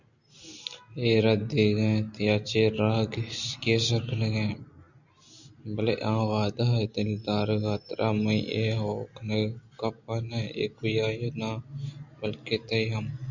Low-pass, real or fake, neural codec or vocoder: 7.2 kHz; real; none